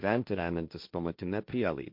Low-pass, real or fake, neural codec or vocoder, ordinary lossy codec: 5.4 kHz; fake; codec, 16 kHz, 1.1 kbps, Voila-Tokenizer; MP3, 48 kbps